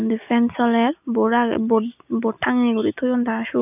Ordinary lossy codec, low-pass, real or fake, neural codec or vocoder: none; 3.6 kHz; real; none